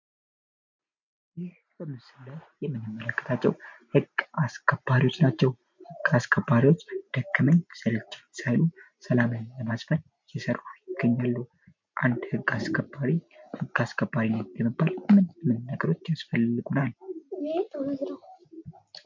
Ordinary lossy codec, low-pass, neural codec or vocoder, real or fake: MP3, 64 kbps; 7.2 kHz; none; real